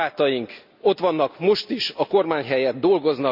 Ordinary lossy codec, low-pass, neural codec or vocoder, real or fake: none; 5.4 kHz; none; real